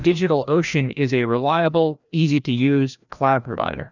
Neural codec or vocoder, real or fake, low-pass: codec, 16 kHz, 1 kbps, FreqCodec, larger model; fake; 7.2 kHz